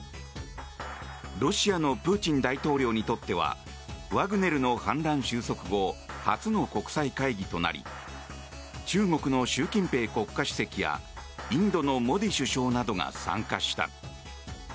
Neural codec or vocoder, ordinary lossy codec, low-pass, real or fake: none; none; none; real